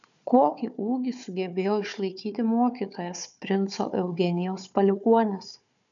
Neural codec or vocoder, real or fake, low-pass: codec, 16 kHz, 4 kbps, FunCodec, trained on Chinese and English, 50 frames a second; fake; 7.2 kHz